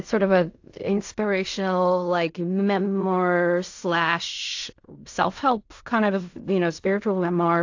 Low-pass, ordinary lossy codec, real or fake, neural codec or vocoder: 7.2 kHz; AAC, 48 kbps; fake; codec, 16 kHz in and 24 kHz out, 0.4 kbps, LongCat-Audio-Codec, fine tuned four codebook decoder